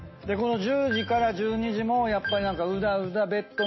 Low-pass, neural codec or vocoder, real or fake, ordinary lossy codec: 7.2 kHz; none; real; MP3, 24 kbps